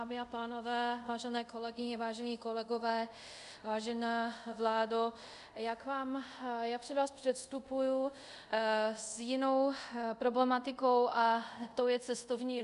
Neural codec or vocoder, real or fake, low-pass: codec, 24 kHz, 0.5 kbps, DualCodec; fake; 10.8 kHz